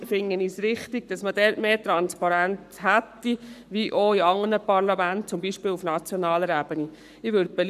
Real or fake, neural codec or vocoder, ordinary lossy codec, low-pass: fake; codec, 44.1 kHz, 7.8 kbps, Pupu-Codec; none; 14.4 kHz